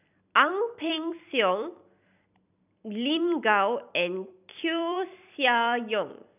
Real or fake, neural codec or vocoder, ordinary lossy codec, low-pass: fake; vocoder, 44.1 kHz, 128 mel bands every 256 samples, BigVGAN v2; none; 3.6 kHz